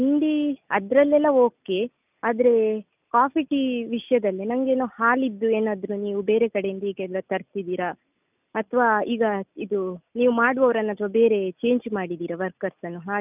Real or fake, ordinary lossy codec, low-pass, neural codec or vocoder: real; none; 3.6 kHz; none